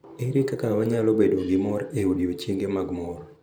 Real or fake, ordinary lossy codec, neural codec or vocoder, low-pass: fake; none; vocoder, 44.1 kHz, 128 mel bands every 512 samples, BigVGAN v2; none